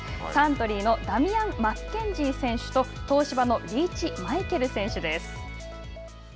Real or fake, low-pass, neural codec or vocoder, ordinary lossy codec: real; none; none; none